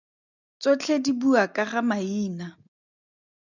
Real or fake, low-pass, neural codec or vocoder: real; 7.2 kHz; none